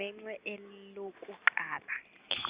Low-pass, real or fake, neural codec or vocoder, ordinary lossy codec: 3.6 kHz; real; none; Opus, 64 kbps